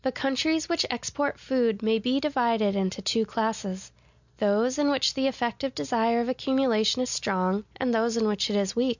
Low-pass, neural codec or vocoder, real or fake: 7.2 kHz; none; real